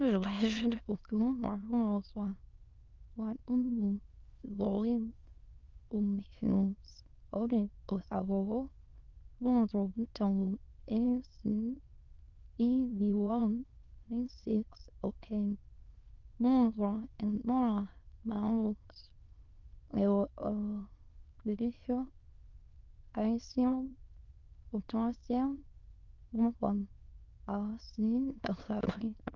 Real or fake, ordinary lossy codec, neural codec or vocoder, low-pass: fake; Opus, 32 kbps; autoencoder, 22.05 kHz, a latent of 192 numbers a frame, VITS, trained on many speakers; 7.2 kHz